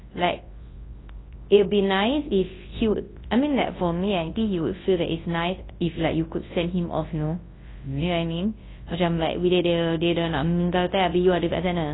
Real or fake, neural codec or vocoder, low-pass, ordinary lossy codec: fake; codec, 24 kHz, 0.9 kbps, WavTokenizer, large speech release; 7.2 kHz; AAC, 16 kbps